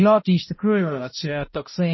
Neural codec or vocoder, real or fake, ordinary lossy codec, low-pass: codec, 16 kHz, 0.5 kbps, X-Codec, HuBERT features, trained on balanced general audio; fake; MP3, 24 kbps; 7.2 kHz